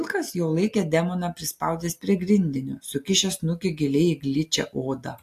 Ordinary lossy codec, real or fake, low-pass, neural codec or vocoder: AAC, 64 kbps; real; 14.4 kHz; none